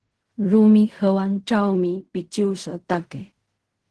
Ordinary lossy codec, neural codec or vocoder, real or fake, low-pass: Opus, 16 kbps; codec, 16 kHz in and 24 kHz out, 0.4 kbps, LongCat-Audio-Codec, fine tuned four codebook decoder; fake; 10.8 kHz